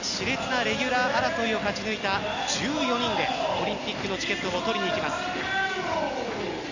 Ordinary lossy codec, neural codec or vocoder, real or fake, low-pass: none; none; real; 7.2 kHz